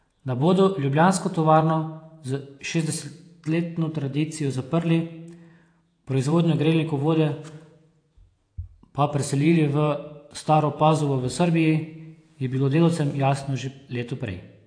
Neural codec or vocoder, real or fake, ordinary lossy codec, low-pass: vocoder, 48 kHz, 128 mel bands, Vocos; fake; AAC, 48 kbps; 9.9 kHz